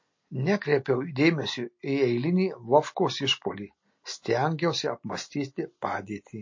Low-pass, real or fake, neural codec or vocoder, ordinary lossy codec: 7.2 kHz; real; none; MP3, 32 kbps